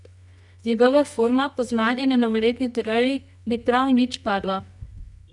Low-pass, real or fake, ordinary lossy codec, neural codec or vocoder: 10.8 kHz; fake; none; codec, 24 kHz, 0.9 kbps, WavTokenizer, medium music audio release